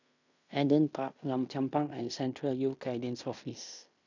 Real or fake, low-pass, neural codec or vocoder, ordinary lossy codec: fake; 7.2 kHz; codec, 16 kHz in and 24 kHz out, 0.9 kbps, LongCat-Audio-Codec, fine tuned four codebook decoder; none